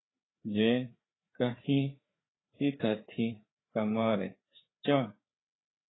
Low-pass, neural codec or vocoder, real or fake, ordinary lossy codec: 7.2 kHz; codec, 16 kHz, 4 kbps, FreqCodec, larger model; fake; AAC, 16 kbps